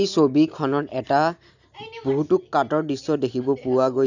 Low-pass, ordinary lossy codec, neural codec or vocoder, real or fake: 7.2 kHz; none; none; real